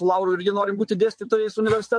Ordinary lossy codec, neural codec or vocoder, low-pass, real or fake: MP3, 48 kbps; vocoder, 22.05 kHz, 80 mel bands, WaveNeXt; 9.9 kHz; fake